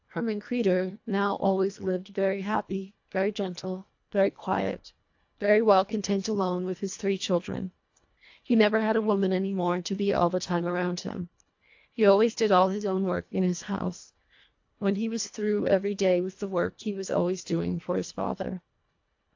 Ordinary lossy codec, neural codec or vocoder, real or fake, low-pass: AAC, 48 kbps; codec, 24 kHz, 1.5 kbps, HILCodec; fake; 7.2 kHz